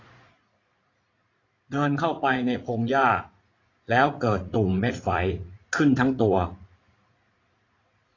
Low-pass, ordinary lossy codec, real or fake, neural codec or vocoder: 7.2 kHz; AAC, 32 kbps; fake; vocoder, 44.1 kHz, 80 mel bands, Vocos